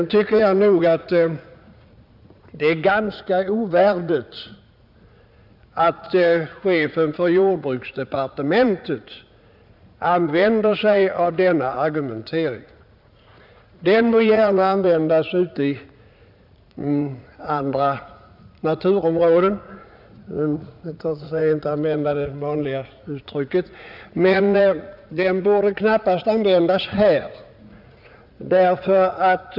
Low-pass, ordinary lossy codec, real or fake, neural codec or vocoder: 5.4 kHz; none; fake; vocoder, 44.1 kHz, 80 mel bands, Vocos